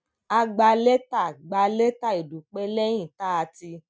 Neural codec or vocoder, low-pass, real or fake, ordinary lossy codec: none; none; real; none